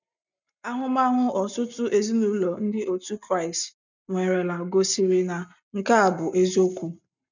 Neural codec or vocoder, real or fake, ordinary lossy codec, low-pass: none; real; none; 7.2 kHz